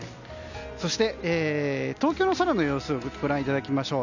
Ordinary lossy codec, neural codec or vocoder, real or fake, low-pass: none; none; real; 7.2 kHz